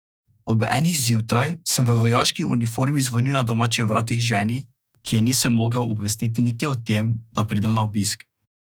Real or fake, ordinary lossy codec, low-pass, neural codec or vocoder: fake; none; none; codec, 44.1 kHz, 2.6 kbps, DAC